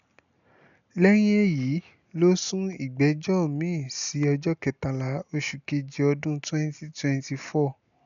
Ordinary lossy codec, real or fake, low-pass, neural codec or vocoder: none; real; 7.2 kHz; none